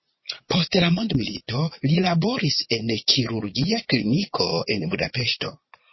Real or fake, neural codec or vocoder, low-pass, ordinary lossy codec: fake; vocoder, 22.05 kHz, 80 mel bands, Vocos; 7.2 kHz; MP3, 24 kbps